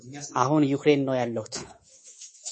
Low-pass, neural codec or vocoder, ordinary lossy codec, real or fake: 10.8 kHz; codec, 24 kHz, 3.1 kbps, DualCodec; MP3, 32 kbps; fake